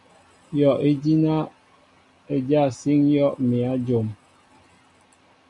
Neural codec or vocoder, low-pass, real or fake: none; 10.8 kHz; real